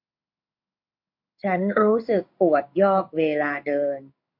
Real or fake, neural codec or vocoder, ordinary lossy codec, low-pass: fake; codec, 16 kHz in and 24 kHz out, 1 kbps, XY-Tokenizer; MP3, 32 kbps; 5.4 kHz